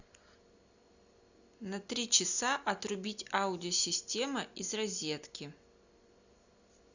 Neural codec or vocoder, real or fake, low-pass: none; real; 7.2 kHz